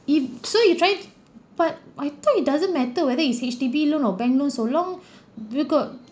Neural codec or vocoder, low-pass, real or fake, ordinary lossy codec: none; none; real; none